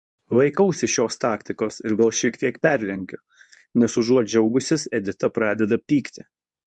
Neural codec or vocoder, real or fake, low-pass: codec, 24 kHz, 0.9 kbps, WavTokenizer, medium speech release version 2; fake; 10.8 kHz